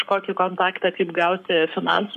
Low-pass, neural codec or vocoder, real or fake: 14.4 kHz; codec, 44.1 kHz, 7.8 kbps, Pupu-Codec; fake